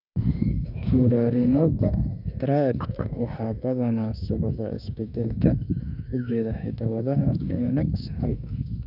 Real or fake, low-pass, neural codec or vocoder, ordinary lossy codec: fake; 5.4 kHz; autoencoder, 48 kHz, 32 numbers a frame, DAC-VAE, trained on Japanese speech; none